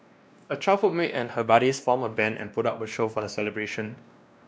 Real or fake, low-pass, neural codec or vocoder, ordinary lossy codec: fake; none; codec, 16 kHz, 1 kbps, X-Codec, WavLM features, trained on Multilingual LibriSpeech; none